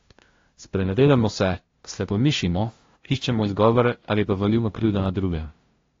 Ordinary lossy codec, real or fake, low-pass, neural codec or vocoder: AAC, 32 kbps; fake; 7.2 kHz; codec, 16 kHz, 0.5 kbps, FunCodec, trained on LibriTTS, 25 frames a second